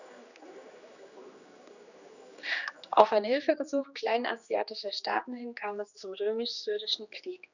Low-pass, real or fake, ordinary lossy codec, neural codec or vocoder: 7.2 kHz; fake; none; codec, 16 kHz, 2 kbps, X-Codec, HuBERT features, trained on general audio